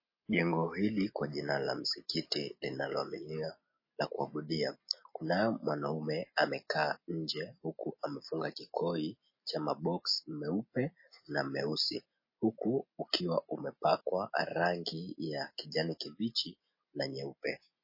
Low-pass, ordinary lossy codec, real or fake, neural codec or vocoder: 5.4 kHz; MP3, 24 kbps; real; none